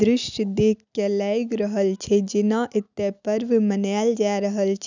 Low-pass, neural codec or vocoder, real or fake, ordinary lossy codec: 7.2 kHz; none; real; none